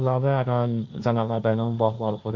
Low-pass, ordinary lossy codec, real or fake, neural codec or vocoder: 7.2 kHz; Opus, 64 kbps; fake; codec, 16 kHz, 1.1 kbps, Voila-Tokenizer